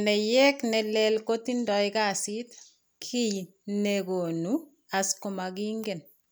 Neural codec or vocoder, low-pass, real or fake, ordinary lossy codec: none; none; real; none